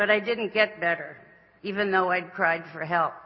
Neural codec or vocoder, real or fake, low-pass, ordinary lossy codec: none; real; 7.2 kHz; MP3, 24 kbps